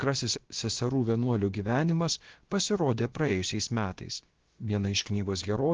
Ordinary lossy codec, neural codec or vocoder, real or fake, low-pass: Opus, 16 kbps; codec, 16 kHz, about 1 kbps, DyCAST, with the encoder's durations; fake; 7.2 kHz